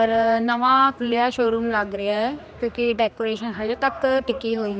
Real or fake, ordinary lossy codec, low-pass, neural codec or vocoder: fake; none; none; codec, 16 kHz, 2 kbps, X-Codec, HuBERT features, trained on general audio